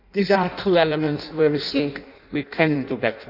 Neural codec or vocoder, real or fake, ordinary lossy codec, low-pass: codec, 16 kHz in and 24 kHz out, 0.6 kbps, FireRedTTS-2 codec; fake; none; 5.4 kHz